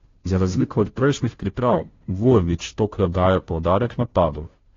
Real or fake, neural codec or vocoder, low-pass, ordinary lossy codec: fake; codec, 16 kHz, 0.5 kbps, FunCodec, trained on Chinese and English, 25 frames a second; 7.2 kHz; AAC, 32 kbps